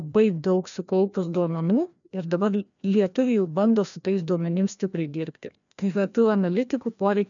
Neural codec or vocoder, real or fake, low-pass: codec, 16 kHz, 1 kbps, FreqCodec, larger model; fake; 7.2 kHz